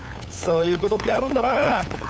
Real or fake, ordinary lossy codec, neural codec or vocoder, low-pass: fake; none; codec, 16 kHz, 8 kbps, FunCodec, trained on LibriTTS, 25 frames a second; none